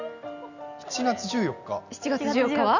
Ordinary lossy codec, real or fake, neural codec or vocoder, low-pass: none; real; none; 7.2 kHz